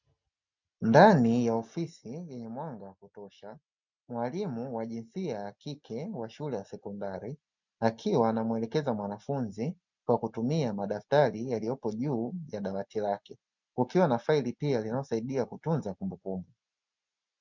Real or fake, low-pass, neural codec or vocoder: real; 7.2 kHz; none